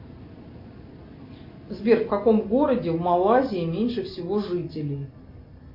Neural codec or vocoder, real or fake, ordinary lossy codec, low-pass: none; real; MP3, 32 kbps; 5.4 kHz